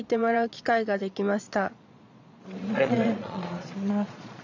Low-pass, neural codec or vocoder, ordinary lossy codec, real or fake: 7.2 kHz; vocoder, 22.05 kHz, 80 mel bands, Vocos; none; fake